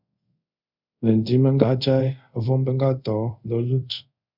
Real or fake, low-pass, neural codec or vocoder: fake; 5.4 kHz; codec, 24 kHz, 0.5 kbps, DualCodec